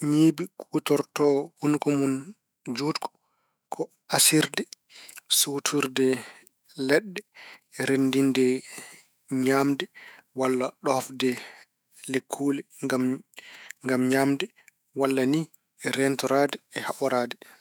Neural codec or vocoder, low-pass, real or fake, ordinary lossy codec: autoencoder, 48 kHz, 128 numbers a frame, DAC-VAE, trained on Japanese speech; none; fake; none